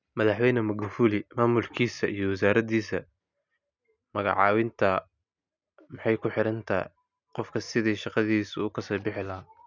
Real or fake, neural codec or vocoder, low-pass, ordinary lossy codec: real; none; 7.2 kHz; none